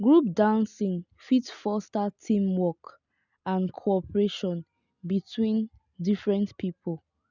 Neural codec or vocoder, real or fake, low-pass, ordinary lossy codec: none; real; 7.2 kHz; none